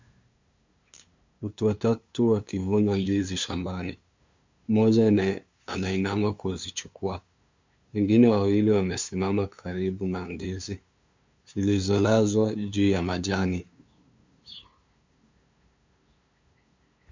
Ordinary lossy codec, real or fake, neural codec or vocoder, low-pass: MP3, 64 kbps; fake; codec, 16 kHz, 2 kbps, FunCodec, trained on LibriTTS, 25 frames a second; 7.2 kHz